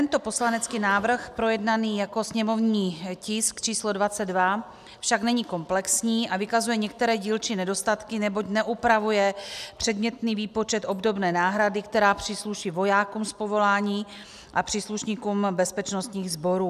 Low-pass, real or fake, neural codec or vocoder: 14.4 kHz; real; none